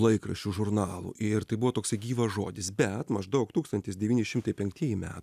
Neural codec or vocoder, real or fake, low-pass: none; real; 14.4 kHz